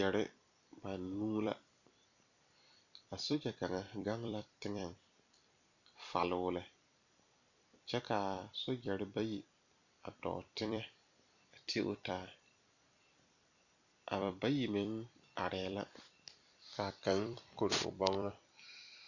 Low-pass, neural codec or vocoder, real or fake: 7.2 kHz; vocoder, 44.1 kHz, 128 mel bands every 256 samples, BigVGAN v2; fake